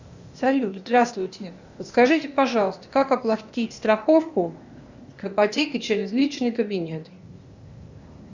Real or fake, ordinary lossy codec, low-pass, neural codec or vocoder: fake; Opus, 64 kbps; 7.2 kHz; codec, 16 kHz, 0.8 kbps, ZipCodec